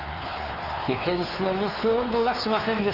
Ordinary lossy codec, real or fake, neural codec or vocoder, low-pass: Opus, 32 kbps; fake; codec, 16 kHz, 4 kbps, X-Codec, WavLM features, trained on Multilingual LibriSpeech; 5.4 kHz